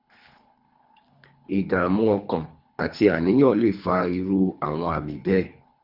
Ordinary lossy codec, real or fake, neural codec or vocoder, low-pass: Opus, 64 kbps; fake; codec, 24 kHz, 3 kbps, HILCodec; 5.4 kHz